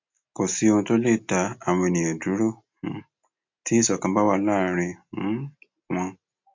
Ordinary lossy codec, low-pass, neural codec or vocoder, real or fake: MP3, 48 kbps; 7.2 kHz; none; real